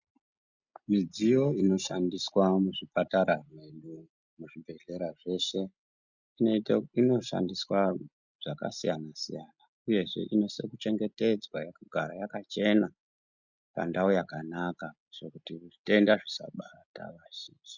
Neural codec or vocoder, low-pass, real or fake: none; 7.2 kHz; real